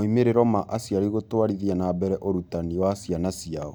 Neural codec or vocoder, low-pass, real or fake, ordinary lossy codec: none; none; real; none